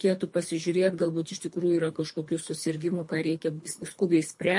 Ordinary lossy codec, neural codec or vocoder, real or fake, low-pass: MP3, 48 kbps; codec, 24 kHz, 3 kbps, HILCodec; fake; 10.8 kHz